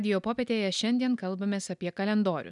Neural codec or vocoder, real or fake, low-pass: none; real; 10.8 kHz